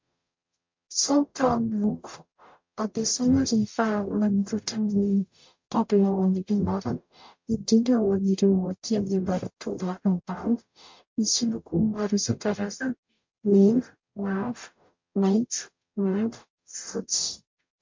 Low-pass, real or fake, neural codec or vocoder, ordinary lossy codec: 7.2 kHz; fake; codec, 44.1 kHz, 0.9 kbps, DAC; MP3, 48 kbps